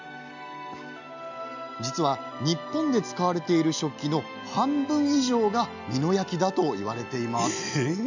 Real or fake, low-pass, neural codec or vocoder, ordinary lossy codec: real; 7.2 kHz; none; none